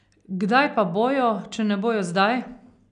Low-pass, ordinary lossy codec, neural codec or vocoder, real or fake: 9.9 kHz; none; none; real